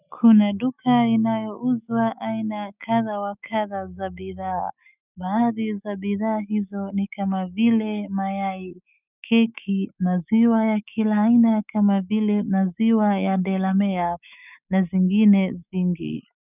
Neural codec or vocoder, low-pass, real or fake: autoencoder, 48 kHz, 128 numbers a frame, DAC-VAE, trained on Japanese speech; 3.6 kHz; fake